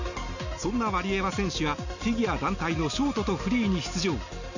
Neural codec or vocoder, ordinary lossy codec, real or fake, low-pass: none; none; real; 7.2 kHz